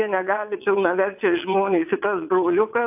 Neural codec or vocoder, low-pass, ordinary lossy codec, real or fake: vocoder, 22.05 kHz, 80 mel bands, WaveNeXt; 3.6 kHz; AAC, 32 kbps; fake